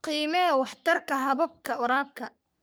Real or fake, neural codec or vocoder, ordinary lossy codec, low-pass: fake; codec, 44.1 kHz, 3.4 kbps, Pupu-Codec; none; none